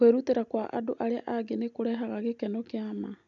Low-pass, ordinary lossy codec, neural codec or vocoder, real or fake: 7.2 kHz; MP3, 96 kbps; none; real